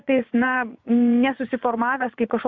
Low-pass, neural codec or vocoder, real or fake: 7.2 kHz; vocoder, 24 kHz, 100 mel bands, Vocos; fake